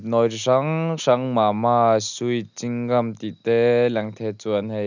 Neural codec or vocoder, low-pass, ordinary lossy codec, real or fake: none; 7.2 kHz; none; real